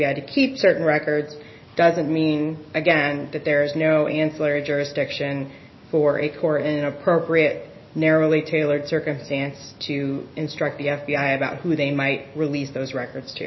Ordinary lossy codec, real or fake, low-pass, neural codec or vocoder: MP3, 24 kbps; real; 7.2 kHz; none